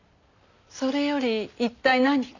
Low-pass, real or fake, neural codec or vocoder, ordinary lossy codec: 7.2 kHz; real; none; none